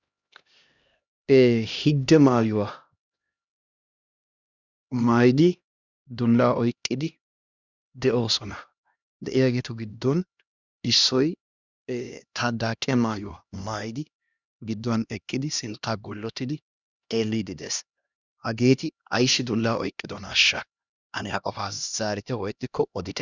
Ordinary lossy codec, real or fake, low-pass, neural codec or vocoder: Opus, 64 kbps; fake; 7.2 kHz; codec, 16 kHz, 1 kbps, X-Codec, HuBERT features, trained on LibriSpeech